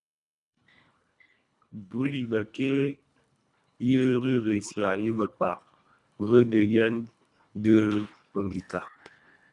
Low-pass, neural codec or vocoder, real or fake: 10.8 kHz; codec, 24 kHz, 1.5 kbps, HILCodec; fake